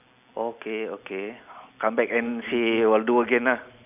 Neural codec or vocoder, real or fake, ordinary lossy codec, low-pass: none; real; none; 3.6 kHz